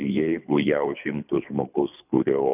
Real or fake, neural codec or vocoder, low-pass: fake; codec, 24 kHz, 3 kbps, HILCodec; 3.6 kHz